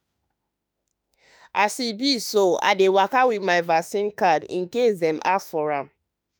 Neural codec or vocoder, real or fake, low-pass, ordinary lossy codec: autoencoder, 48 kHz, 32 numbers a frame, DAC-VAE, trained on Japanese speech; fake; none; none